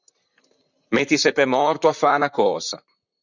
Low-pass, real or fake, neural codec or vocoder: 7.2 kHz; fake; vocoder, 44.1 kHz, 128 mel bands, Pupu-Vocoder